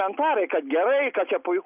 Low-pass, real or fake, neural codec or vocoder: 3.6 kHz; real; none